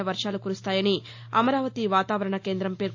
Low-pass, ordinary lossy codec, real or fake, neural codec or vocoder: 7.2 kHz; AAC, 48 kbps; real; none